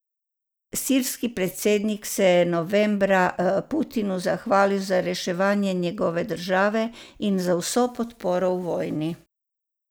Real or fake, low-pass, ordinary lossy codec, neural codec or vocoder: real; none; none; none